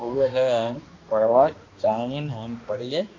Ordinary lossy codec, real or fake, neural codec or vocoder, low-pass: AAC, 32 kbps; fake; codec, 16 kHz, 1 kbps, X-Codec, HuBERT features, trained on balanced general audio; 7.2 kHz